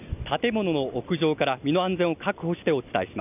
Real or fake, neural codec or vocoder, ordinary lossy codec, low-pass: real; none; none; 3.6 kHz